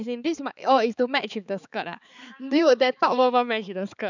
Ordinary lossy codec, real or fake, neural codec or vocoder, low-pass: none; fake; codec, 16 kHz, 4 kbps, X-Codec, HuBERT features, trained on balanced general audio; 7.2 kHz